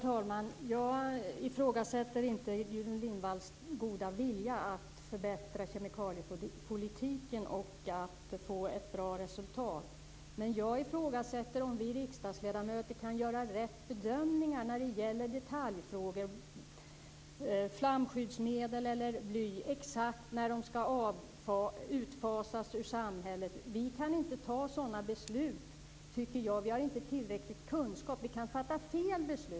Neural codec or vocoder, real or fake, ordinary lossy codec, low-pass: none; real; none; none